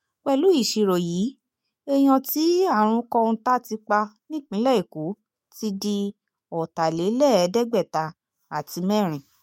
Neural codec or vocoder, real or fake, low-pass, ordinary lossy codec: autoencoder, 48 kHz, 128 numbers a frame, DAC-VAE, trained on Japanese speech; fake; 19.8 kHz; MP3, 64 kbps